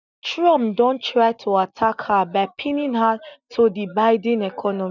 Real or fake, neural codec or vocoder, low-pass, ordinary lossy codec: real; none; 7.2 kHz; none